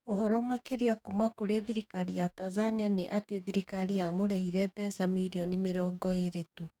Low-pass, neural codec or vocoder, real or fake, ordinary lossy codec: 19.8 kHz; codec, 44.1 kHz, 2.6 kbps, DAC; fake; none